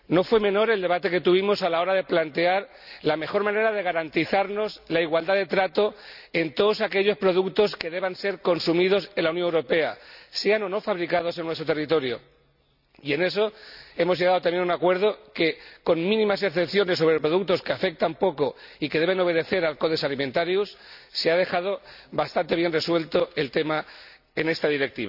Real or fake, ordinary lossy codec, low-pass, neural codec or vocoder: real; none; 5.4 kHz; none